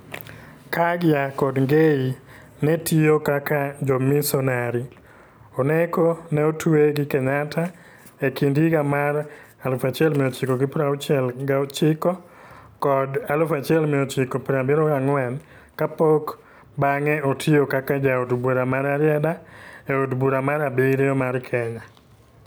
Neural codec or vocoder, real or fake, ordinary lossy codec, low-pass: none; real; none; none